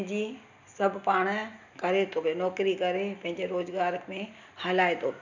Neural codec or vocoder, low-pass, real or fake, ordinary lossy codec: none; 7.2 kHz; real; none